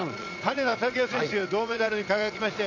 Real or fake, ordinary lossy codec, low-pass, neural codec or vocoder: fake; MP3, 48 kbps; 7.2 kHz; vocoder, 22.05 kHz, 80 mel bands, Vocos